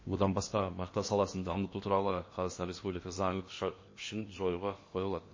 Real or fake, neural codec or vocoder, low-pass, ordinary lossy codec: fake; codec, 16 kHz in and 24 kHz out, 0.8 kbps, FocalCodec, streaming, 65536 codes; 7.2 kHz; MP3, 32 kbps